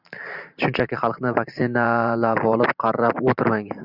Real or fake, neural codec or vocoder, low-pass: fake; vocoder, 44.1 kHz, 128 mel bands every 512 samples, BigVGAN v2; 5.4 kHz